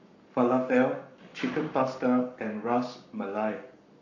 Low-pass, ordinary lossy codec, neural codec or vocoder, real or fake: 7.2 kHz; none; codec, 44.1 kHz, 7.8 kbps, Pupu-Codec; fake